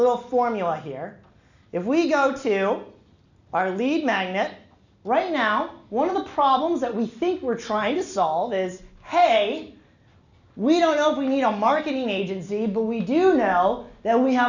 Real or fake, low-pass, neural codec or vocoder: real; 7.2 kHz; none